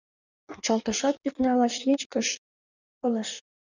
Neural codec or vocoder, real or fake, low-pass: codec, 16 kHz in and 24 kHz out, 1.1 kbps, FireRedTTS-2 codec; fake; 7.2 kHz